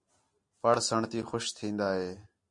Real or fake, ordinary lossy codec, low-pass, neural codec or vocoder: real; MP3, 48 kbps; 10.8 kHz; none